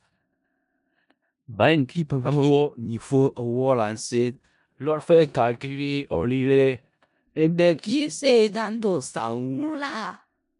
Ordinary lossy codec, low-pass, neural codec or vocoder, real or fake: none; 10.8 kHz; codec, 16 kHz in and 24 kHz out, 0.4 kbps, LongCat-Audio-Codec, four codebook decoder; fake